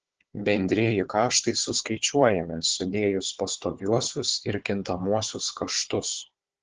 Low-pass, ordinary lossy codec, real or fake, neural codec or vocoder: 7.2 kHz; Opus, 16 kbps; fake; codec, 16 kHz, 4 kbps, FunCodec, trained on Chinese and English, 50 frames a second